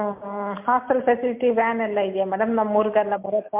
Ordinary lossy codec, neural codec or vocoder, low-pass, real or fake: MP3, 32 kbps; none; 3.6 kHz; real